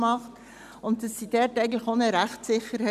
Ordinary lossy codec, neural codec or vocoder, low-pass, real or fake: none; none; 14.4 kHz; real